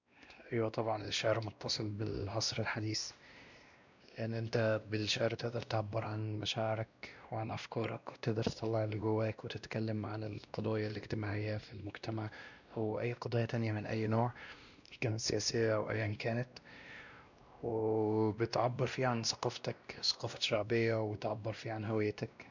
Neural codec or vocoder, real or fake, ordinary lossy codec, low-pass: codec, 16 kHz, 1 kbps, X-Codec, WavLM features, trained on Multilingual LibriSpeech; fake; none; 7.2 kHz